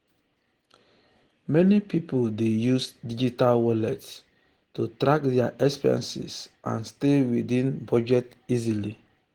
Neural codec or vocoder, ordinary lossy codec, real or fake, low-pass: none; Opus, 16 kbps; real; 19.8 kHz